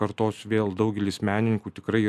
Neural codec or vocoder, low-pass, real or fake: none; 14.4 kHz; real